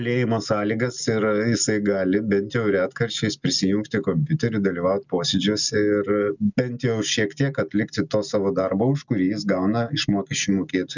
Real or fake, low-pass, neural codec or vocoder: real; 7.2 kHz; none